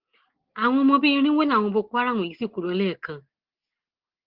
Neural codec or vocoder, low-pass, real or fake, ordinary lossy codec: none; 5.4 kHz; real; Opus, 16 kbps